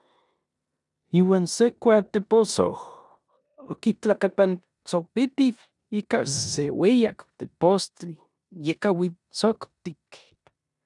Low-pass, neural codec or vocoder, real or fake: 10.8 kHz; codec, 16 kHz in and 24 kHz out, 0.9 kbps, LongCat-Audio-Codec, four codebook decoder; fake